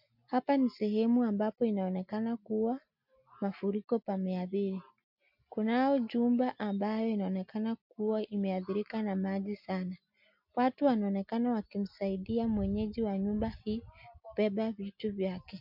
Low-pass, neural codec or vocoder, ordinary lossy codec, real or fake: 5.4 kHz; none; MP3, 48 kbps; real